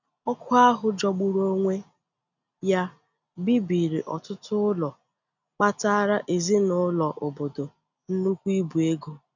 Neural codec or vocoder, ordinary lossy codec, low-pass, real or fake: none; none; 7.2 kHz; real